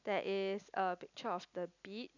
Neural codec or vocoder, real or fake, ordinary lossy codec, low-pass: none; real; none; 7.2 kHz